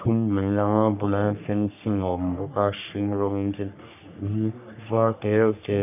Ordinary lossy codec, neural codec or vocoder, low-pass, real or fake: none; codec, 44.1 kHz, 3.4 kbps, Pupu-Codec; 3.6 kHz; fake